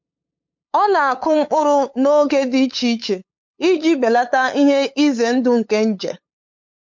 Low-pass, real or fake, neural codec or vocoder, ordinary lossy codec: 7.2 kHz; fake; codec, 16 kHz, 8 kbps, FunCodec, trained on LibriTTS, 25 frames a second; MP3, 48 kbps